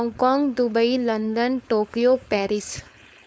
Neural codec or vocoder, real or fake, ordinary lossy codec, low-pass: codec, 16 kHz, 4.8 kbps, FACodec; fake; none; none